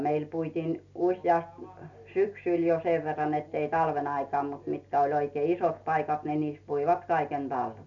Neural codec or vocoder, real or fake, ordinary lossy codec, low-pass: none; real; none; 7.2 kHz